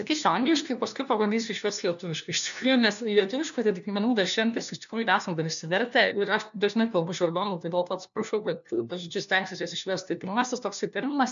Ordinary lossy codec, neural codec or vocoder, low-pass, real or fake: MP3, 96 kbps; codec, 16 kHz, 1 kbps, FunCodec, trained on LibriTTS, 50 frames a second; 7.2 kHz; fake